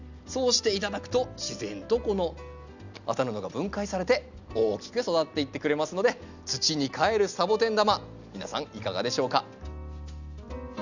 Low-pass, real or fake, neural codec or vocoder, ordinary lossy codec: 7.2 kHz; real; none; none